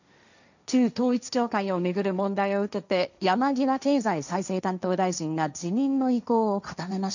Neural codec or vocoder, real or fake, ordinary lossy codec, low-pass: codec, 16 kHz, 1.1 kbps, Voila-Tokenizer; fake; none; 7.2 kHz